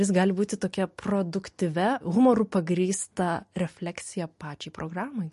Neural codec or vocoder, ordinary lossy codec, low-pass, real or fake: none; MP3, 48 kbps; 14.4 kHz; real